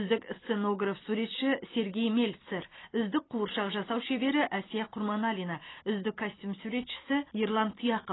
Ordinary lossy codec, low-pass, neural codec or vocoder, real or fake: AAC, 16 kbps; 7.2 kHz; none; real